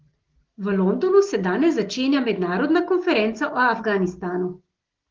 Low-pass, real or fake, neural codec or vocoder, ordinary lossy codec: 7.2 kHz; real; none; Opus, 16 kbps